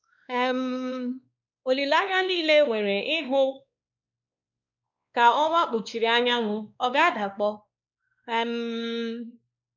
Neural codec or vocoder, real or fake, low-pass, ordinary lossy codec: codec, 16 kHz, 2 kbps, X-Codec, WavLM features, trained on Multilingual LibriSpeech; fake; 7.2 kHz; none